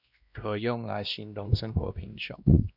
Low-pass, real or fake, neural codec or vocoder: 5.4 kHz; fake; codec, 16 kHz, 1 kbps, X-Codec, WavLM features, trained on Multilingual LibriSpeech